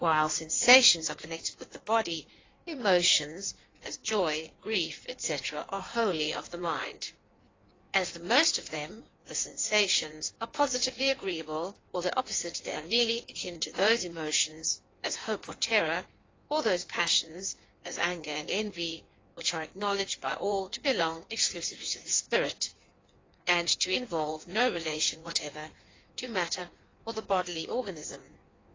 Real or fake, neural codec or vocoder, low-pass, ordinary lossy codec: fake; codec, 16 kHz in and 24 kHz out, 1.1 kbps, FireRedTTS-2 codec; 7.2 kHz; AAC, 32 kbps